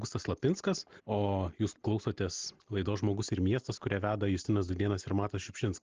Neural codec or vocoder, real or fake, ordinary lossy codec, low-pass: none; real; Opus, 16 kbps; 7.2 kHz